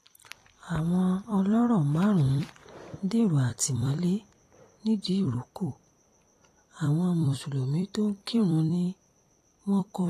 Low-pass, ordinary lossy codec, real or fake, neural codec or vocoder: 14.4 kHz; AAC, 48 kbps; fake; vocoder, 44.1 kHz, 128 mel bands every 256 samples, BigVGAN v2